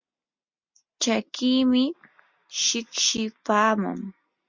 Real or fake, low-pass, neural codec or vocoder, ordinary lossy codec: real; 7.2 kHz; none; MP3, 64 kbps